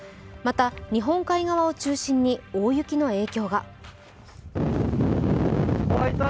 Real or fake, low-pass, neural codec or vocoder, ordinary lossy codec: real; none; none; none